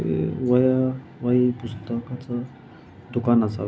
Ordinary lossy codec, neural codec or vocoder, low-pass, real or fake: none; none; none; real